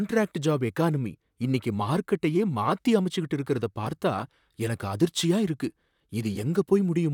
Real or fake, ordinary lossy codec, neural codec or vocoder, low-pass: fake; none; vocoder, 44.1 kHz, 128 mel bands, Pupu-Vocoder; 19.8 kHz